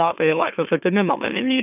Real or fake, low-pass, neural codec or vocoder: fake; 3.6 kHz; autoencoder, 44.1 kHz, a latent of 192 numbers a frame, MeloTTS